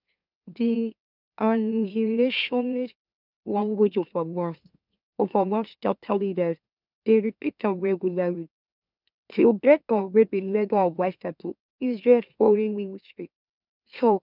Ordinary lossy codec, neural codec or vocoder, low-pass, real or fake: none; autoencoder, 44.1 kHz, a latent of 192 numbers a frame, MeloTTS; 5.4 kHz; fake